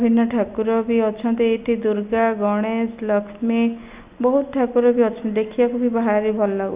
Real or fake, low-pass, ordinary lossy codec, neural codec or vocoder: real; 3.6 kHz; Opus, 64 kbps; none